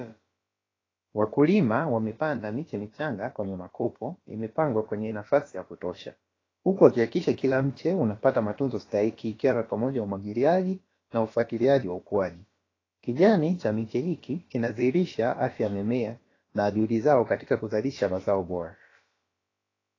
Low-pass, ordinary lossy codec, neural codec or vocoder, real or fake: 7.2 kHz; AAC, 32 kbps; codec, 16 kHz, about 1 kbps, DyCAST, with the encoder's durations; fake